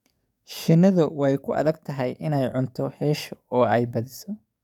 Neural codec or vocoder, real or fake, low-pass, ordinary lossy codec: codec, 44.1 kHz, 7.8 kbps, DAC; fake; 19.8 kHz; none